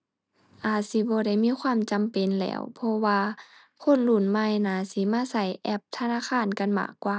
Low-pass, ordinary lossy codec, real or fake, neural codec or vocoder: none; none; real; none